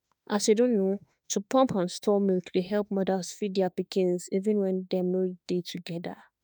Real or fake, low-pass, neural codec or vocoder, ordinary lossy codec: fake; none; autoencoder, 48 kHz, 32 numbers a frame, DAC-VAE, trained on Japanese speech; none